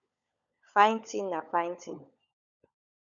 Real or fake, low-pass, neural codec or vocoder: fake; 7.2 kHz; codec, 16 kHz, 16 kbps, FunCodec, trained on LibriTTS, 50 frames a second